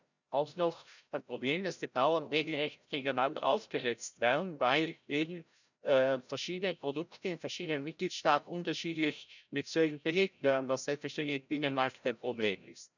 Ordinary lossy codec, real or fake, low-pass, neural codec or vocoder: none; fake; 7.2 kHz; codec, 16 kHz, 0.5 kbps, FreqCodec, larger model